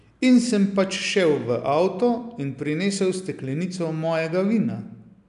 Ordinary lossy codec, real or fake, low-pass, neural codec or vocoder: none; real; 10.8 kHz; none